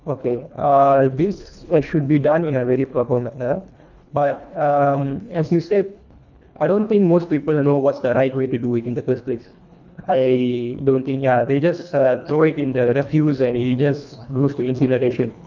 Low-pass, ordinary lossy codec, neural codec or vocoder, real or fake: 7.2 kHz; none; codec, 24 kHz, 1.5 kbps, HILCodec; fake